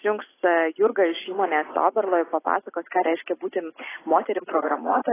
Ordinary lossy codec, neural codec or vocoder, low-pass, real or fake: AAC, 16 kbps; none; 3.6 kHz; real